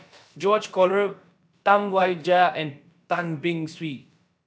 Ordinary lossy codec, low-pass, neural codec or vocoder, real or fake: none; none; codec, 16 kHz, about 1 kbps, DyCAST, with the encoder's durations; fake